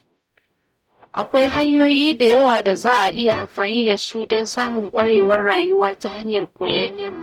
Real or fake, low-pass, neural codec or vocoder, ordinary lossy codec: fake; 19.8 kHz; codec, 44.1 kHz, 0.9 kbps, DAC; none